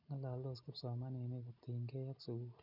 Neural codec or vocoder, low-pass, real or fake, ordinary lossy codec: none; 5.4 kHz; real; none